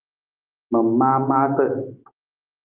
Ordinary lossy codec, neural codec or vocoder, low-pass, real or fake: Opus, 32 kbps; autoencoder, 48 kHz, 128 numbers a frame, DAC-VAE, trained on Japanese speech; 3.6 kHz; fake